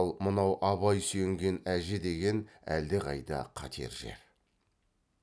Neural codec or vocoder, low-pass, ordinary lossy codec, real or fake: none; none; none; real